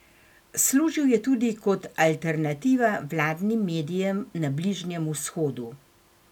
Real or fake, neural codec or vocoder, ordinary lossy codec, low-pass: real; none; none; 19.8 kHz